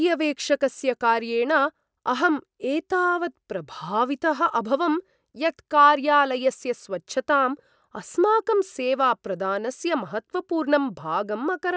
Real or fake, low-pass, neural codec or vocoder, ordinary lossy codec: real; none; none; none